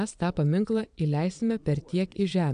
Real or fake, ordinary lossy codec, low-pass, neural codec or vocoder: fake; AAC, 96 kbps; 9.9 kHz; vocoder, 22.05 kHz, 80 mel bands, Vocos